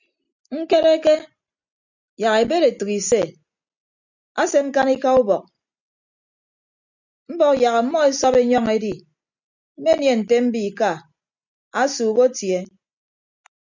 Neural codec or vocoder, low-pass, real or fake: none; 7.2 kHz; real